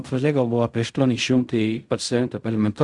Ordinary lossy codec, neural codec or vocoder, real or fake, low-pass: Opus, 64 kbps; codec, 16 kHz in and 24 kHz out, 0.4 kbps, LongCat-Audio-Codec, fine tuned four codebook decoder; fake; 10.8 kHz